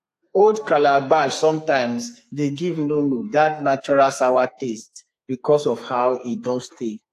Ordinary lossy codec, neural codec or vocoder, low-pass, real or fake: AAC, 64 kbps; codec, 32 kHz, 1.9 kbps, SNAC; 14.4 kHz; fake